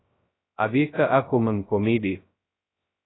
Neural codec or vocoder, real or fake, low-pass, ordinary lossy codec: codec, 16 kHz, 0.2 kbps, FocalCodec; fake; 7.2 kHz; AAC, 16 kbps